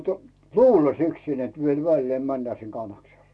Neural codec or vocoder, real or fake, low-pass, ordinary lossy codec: none; real; none; none